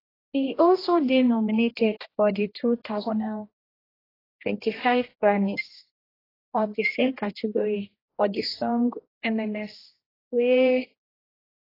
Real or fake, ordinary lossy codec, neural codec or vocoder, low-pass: fake; AAC, 24 kbps; codec, 16 kHz, 1 kbps, X-Codec, HuBERT features, trained on general audio; 5.4 kHz